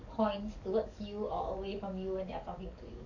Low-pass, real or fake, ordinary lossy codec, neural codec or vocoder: 7.2 kHz; fake; none; codec, 44.1 kHz, 7.8 kbps, DAC